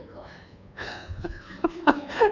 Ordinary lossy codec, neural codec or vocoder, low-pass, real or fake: none; codec, 24 kHz, 1.2 kbps, DualCodec; 7.2 kHz; fake